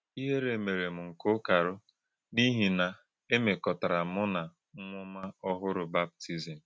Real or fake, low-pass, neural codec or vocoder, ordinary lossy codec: real; none; none; none